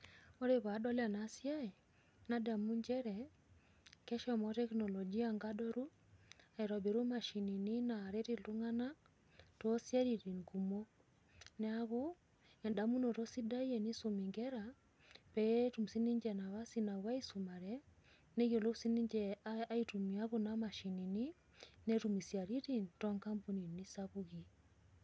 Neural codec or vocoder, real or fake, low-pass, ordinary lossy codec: none; real; none; none